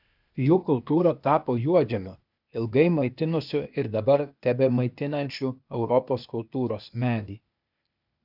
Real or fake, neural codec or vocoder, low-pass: fake; codec, 16 kHz, 0.8 kbps, ZipCodec; 5.4 kHz